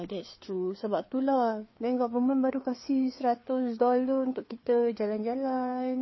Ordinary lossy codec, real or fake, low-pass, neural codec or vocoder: MP3, 24 kbps; fake; 7.2 kHz; codec, 16 kHz, 4 kbps, FreqCodec, larger model